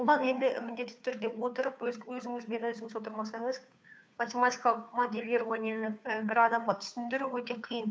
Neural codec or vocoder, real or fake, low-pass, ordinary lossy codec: codec, 16 kHz, 2 kbps, FunCodec, trained on Chinese and English, 25 frames a second; fake; none; none